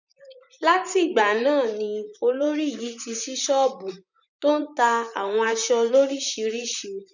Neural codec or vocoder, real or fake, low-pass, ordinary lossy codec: vocoder, 44.1 kHz, 128 mel bands, Pupu-Vocoder; fake; 7.2 kHz; none